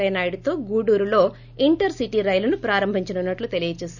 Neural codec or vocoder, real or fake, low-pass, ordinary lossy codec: none; real; 7.2 kHz; none